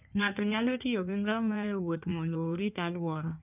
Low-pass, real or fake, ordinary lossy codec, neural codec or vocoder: 3.6 kHz; fake; none; codec, 16 kHz in and 24 kHz out, 1.1 kbps, FireRedTTS-2 codec